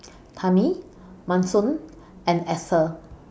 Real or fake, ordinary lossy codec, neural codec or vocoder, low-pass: real; none; none; none